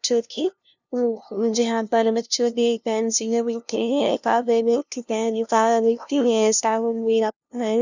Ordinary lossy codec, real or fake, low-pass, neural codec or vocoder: none; fake; 7.2 kHz; codec, 16 kHz, 0.5 kbps, FunCodec, trained on LibriTTS, 25 frames a second